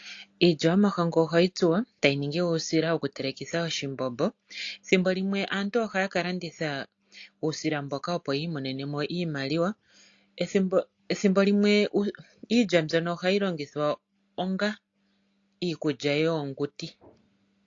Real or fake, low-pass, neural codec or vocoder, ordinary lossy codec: real; 7.2 kHz; none; AAC, 48 kbps